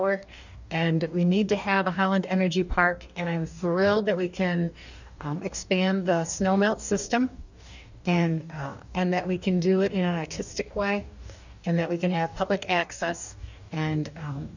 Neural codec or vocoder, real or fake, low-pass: codec, 44.1 kHz, 2.6 kbps, DAC; fake; 7.2 kHz